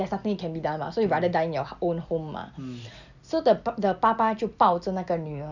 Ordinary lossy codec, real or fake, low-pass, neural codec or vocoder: none; real; 7.2 kHz; none